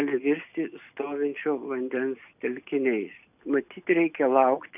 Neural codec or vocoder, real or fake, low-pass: none; real; 3.6 kHz